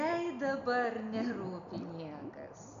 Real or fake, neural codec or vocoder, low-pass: real; none; 7.2 kHz